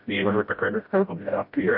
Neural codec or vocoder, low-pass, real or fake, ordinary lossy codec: codec, 16 kHz, 0.5 kbps, FreqCodec, smaller model; 5.4 kHz; fake; MP3, 32 kbps